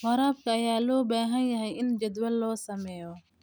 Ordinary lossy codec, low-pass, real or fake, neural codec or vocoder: none; none; real; none